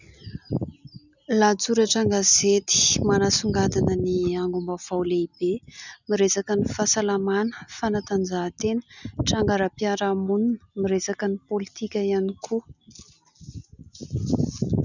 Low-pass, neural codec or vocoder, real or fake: 7.2 kHz; none; real